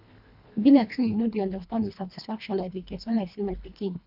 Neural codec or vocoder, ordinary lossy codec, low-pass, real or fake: codec, 24 kHz, 1.5 kbps, HILCodec; none; 5.4 kHz; fake